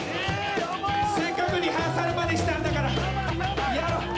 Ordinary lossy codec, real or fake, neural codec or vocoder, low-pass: none; real; none; none